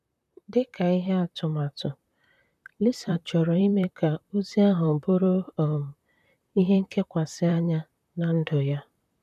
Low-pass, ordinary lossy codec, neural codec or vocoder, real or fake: 14.4 kHz; none; vocoder, 44.1 kHz, 128 mel bands, Pupu-Vocoder; fake